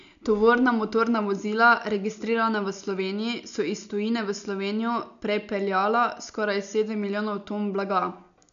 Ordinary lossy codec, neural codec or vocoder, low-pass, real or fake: none; none; 7.2 kHz; real